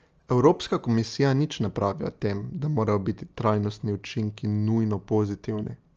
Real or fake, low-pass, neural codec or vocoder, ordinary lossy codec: real; 7.2 kHz; none; Opus, 24 kbps